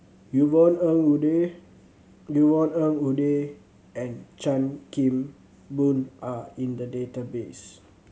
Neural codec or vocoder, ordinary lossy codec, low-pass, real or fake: none; none; none; real